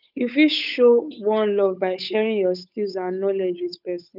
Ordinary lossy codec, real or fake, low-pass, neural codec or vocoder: none; fake; 5.4 kHz; codec, 16 kHz, 8 kbps, FunCodec, trained on Chinese and English, 25 frames a second